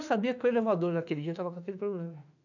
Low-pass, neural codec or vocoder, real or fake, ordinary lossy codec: 7.2 kHz; autoencoder, 48 kHz, 32 numbers a frame, DAC-VAE, trained on Japanese speech; fake; none